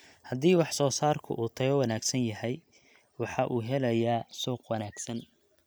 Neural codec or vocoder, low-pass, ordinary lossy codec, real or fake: none; none; none; real